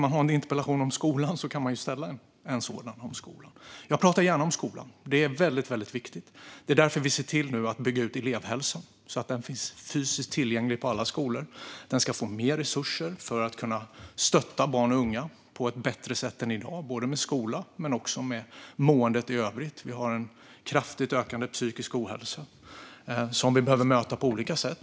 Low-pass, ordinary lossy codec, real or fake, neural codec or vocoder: none; none; real; none